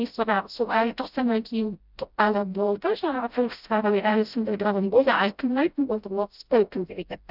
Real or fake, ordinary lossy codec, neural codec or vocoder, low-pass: fake; none; codec, 16 kHz, 0.5 kbps, FreqCodec, smaller model; 5.4 kHz